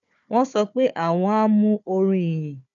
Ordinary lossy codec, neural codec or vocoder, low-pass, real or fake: none; codec, 16 kHz, 4 kbps, FunCodec, trained on Chinese and English, 50 frames a second; 7.2 kHz; fake